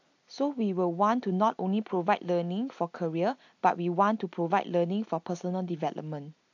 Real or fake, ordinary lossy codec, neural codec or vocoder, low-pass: real; AAC, 48 kbps; none; 7.2 kHz